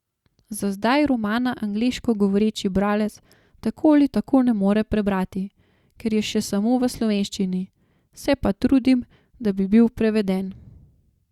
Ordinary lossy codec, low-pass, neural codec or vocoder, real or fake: Opus, 64 kbps; 19.8 kHz; none; real